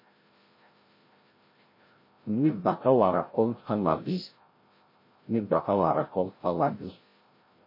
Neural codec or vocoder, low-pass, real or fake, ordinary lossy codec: codec, 16 kHz, 0.5 kbps, FreqCodec, larger model; 5.4 kHz; fake; MP3, 24 kbps